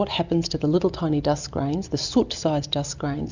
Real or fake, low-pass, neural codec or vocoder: real; 7.2 kHz; none